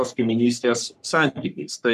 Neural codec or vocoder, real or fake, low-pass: codec, 44.1 kHz, 3.4 kbps, Pupu-Codec; fake; 14.4 kHz